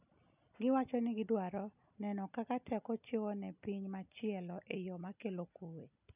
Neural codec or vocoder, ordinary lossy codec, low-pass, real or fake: none; none; 3.6 kHz; real